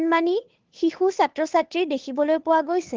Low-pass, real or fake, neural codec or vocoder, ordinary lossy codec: 7.2 kHz; fake; codec, 16 kHz, 2 kbps, FunCodec, trained on Chinese and English, 25 frames a second; Opus, 16 kbps